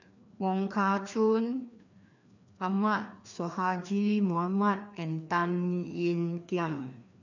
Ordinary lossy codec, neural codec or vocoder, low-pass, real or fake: none; codec, 16 kHz, 2 kbps, FreqCodec, larger model; 7.2 kHz; fake